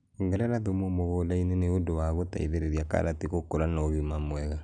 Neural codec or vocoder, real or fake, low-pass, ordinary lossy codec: none; real; 9.9 kHz; none